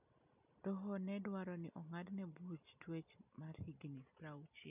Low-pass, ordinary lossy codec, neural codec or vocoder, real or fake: 3.6 kHz; none; none; real